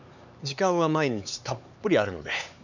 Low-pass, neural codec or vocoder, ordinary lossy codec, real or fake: 7.2 kHz; codec, 16 kHz, 4 kbps, X-Codec, HuBERT features, trained on LibriSpeech; none; fake